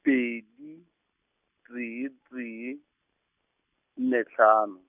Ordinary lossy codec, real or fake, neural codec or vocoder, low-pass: none; real; none; 3.6 kHz